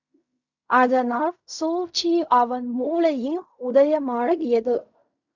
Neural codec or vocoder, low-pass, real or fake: codec, 16 kHz in and 24 kHz out, 0.4 kbps, LongCat-Audio-Codec, fine tuned four codebook decoder; 7.2 kHz; fake